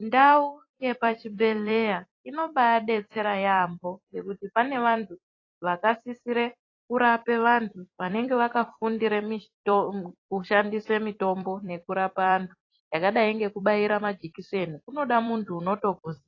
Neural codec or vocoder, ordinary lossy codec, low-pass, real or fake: vocoder, 44.1 kHz, 128 mel bands every 256 samples, BigVGAN v2; AAC, 32 kbps; 7.2 kHz; fake